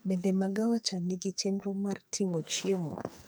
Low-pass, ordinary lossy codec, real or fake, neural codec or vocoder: none; none; fake; codec, 44.1 kHz, 2.6 kbps, SNAC